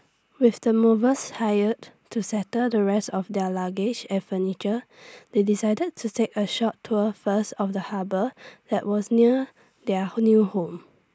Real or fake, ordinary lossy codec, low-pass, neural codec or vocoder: real; none; none; none